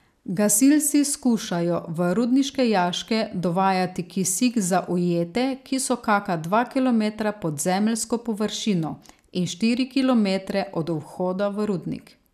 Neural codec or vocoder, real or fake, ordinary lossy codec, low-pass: none; real; none; 14.4 kHz